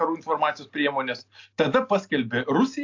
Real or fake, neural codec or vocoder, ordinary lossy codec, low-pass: real; none; AAC, 48 kbps; 7.2 kHz